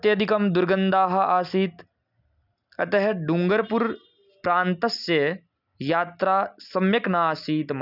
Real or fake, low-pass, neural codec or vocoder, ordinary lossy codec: real; 5.4 kHz; none; none